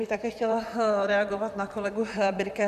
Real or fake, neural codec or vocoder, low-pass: fake; vocoder, 44.1 kHz, 128 mel bands, Pupu-Vocoder; 14.4 kHz